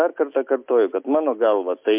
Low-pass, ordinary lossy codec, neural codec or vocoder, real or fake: 3.6 kHz; MP3, 32 kbps; none; real